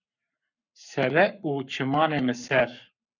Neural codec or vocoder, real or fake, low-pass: codec, 44.1 kHz, 7.8 kbps, Pupu-Codec; fake; 7.2 kHz